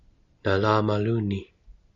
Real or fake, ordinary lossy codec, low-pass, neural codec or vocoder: real; MP3, 96 kbps; 7.2 kHz; none